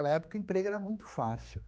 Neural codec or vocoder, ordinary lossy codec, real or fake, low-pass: codec, 16 kHz, 2 kbps, X-Codec, HuBERT features, trained on general audio; none; fake; none